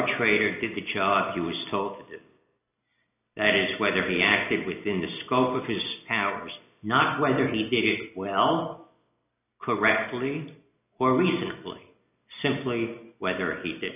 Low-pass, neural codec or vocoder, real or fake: 3.6 kHz; none; real